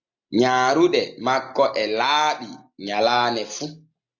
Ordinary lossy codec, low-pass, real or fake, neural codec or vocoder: AAC, 48 kbps; 7.2 kHz; real; none